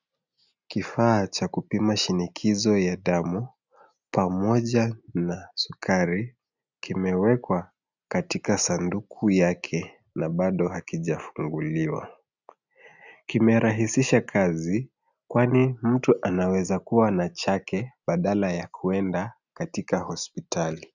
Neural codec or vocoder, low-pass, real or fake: none; 7.2 kHz; real